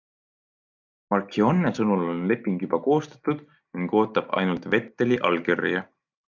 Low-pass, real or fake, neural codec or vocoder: 7.2 kHz; real; none